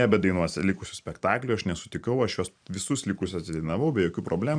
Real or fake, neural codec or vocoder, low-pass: fake; vocoder, 44.1 kHz, 128 mel bands every 512 samples, BigVGAN v2; 9.9 kHz